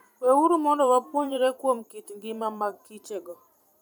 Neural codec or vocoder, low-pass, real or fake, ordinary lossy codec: vocoder, 44.1 kHz, 128 mel bands every 512 samples, BigVGAN v2; 19.8 kHz; fake; none